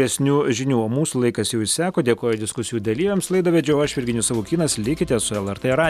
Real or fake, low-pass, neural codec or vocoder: real; 14.4 kHz; none